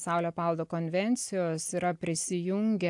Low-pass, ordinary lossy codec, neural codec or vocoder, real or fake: 10.8 kHz; AAC, 64 kbps; none; real